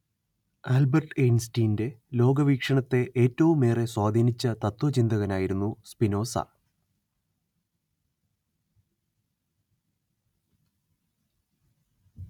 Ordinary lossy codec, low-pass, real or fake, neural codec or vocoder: none; 19.8 kHz; real; none